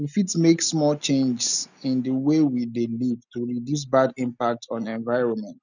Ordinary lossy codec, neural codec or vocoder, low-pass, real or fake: none; none; 7.2 kHz; real